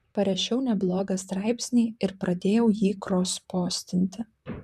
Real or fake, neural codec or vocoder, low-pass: fake; vocoder, 44.1 kHz, 128 mel bands, Pupu-Vocoder; 14.4 kHz